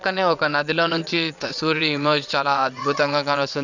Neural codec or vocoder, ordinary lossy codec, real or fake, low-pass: vocoder, 22.05 kHz, 80 mel bands, WaveNeXt; none; fake; 7.2 kHz